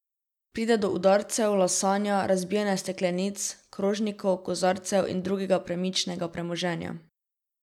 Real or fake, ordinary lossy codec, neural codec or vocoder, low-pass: real; none; none; 19.8 kHz